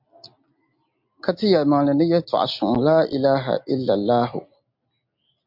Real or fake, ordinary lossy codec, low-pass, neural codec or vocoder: real; MP3, 48 kbps; 5.4 kHz; none